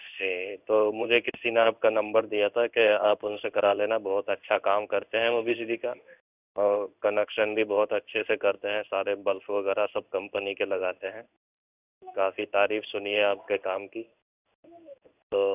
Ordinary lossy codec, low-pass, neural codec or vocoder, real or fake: none; 3.6 kHz; codec, 16 kHz in and 24 kHz out, 1 kbps, XY-Tokenizer; fake